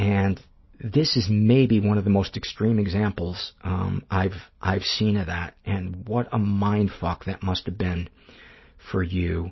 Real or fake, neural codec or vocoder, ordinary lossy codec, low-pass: real; none; MP3, 24 kbps; 7.2 kHz